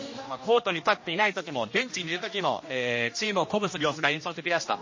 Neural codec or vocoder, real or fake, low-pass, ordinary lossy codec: codec, 16 kHz, 1 kbps, X-Codec, HuBERT features, trained on general audio; fake; 7.2 kHz; MP3, 32 kbps